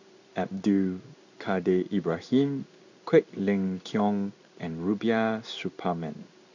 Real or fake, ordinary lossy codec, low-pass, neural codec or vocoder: fake; none; 7.2 kHz; codec, 16 kHz in and 24 kHz out, 1 kbps, XY-Tokenizer